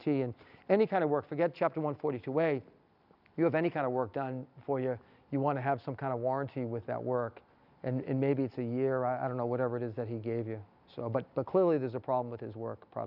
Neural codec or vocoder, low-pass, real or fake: none; 5.4 kHz; real